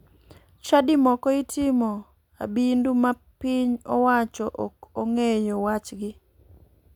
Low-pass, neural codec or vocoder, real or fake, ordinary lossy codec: 19.8 kHz; none; real; none